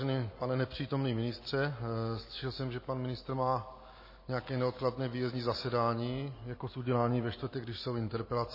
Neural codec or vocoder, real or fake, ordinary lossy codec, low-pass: none; real; MP3, 24 kbps; 5.4 kHz